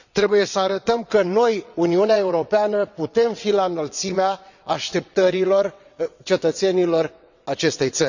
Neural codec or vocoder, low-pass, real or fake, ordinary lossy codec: vocoder, 22.05 kHz, 80 mel bands, WaveNeXt; 7.2 kHz; fake; none